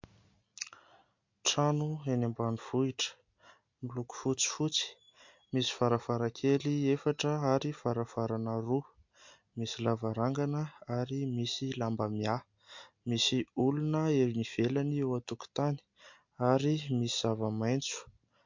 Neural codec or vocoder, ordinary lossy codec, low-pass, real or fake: none; MP3, 48 kbps; 7.2 kHz; real